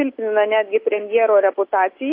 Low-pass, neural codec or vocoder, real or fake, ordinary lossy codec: 5.4 kHz; none; real; AAC, 32 kbps